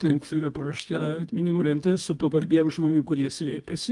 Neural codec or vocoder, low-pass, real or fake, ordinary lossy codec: codec, 24 kHz, 0.9 kbps, WavTokenizer, medium music audio release; 10.8 kHz; fake; Opus, 32 kbps